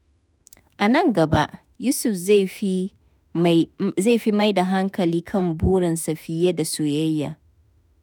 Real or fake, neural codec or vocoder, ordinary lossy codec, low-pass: fake; autoencoder, 48 kHz, 32 numbers a frame, DAC-VAE, trained on Japanese speech; none; none